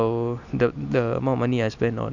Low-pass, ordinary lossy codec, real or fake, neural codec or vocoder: 7.2 kHz; none; real; none